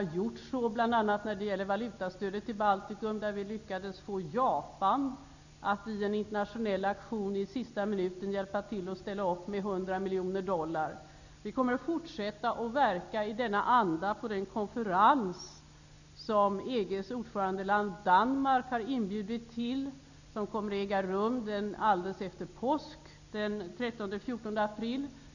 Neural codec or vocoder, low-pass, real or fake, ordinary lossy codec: none; 7.2 kHz; real; none